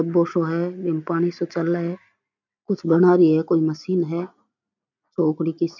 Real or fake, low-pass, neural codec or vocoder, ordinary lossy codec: real; 7.2 kHz; none; none